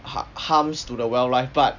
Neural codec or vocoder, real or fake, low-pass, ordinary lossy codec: none; real; 7.2 kHz; none